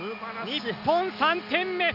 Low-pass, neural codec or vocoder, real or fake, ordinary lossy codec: 5.4 kHz; none; real; none